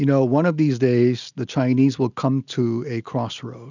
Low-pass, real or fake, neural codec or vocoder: 7.2 kHz; real; none